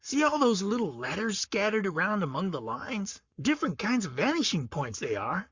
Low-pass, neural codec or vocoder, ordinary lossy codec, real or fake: 7.2 kHz; codec, 44.1 kHz, 7.8 kbps, Pupu-Codec; Opus, 64 kbps; fake